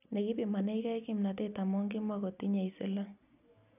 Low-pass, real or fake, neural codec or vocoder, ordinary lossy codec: 3.6 kHz; real; none; none